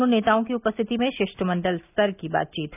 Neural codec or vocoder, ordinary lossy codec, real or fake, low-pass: none; none; real; 3.6 kHz